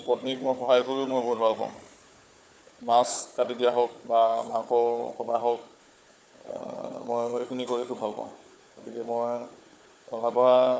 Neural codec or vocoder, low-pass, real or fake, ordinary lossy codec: codec, 16 kHz, 4 kbps, FunCodec, trained on Chinese and English, 50 frames a second; none; fake; none